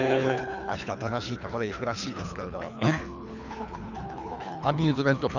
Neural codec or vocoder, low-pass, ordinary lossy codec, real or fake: codec, 24 kHz, 3 kbps, HILCodec; 7.2 kHz; none; fake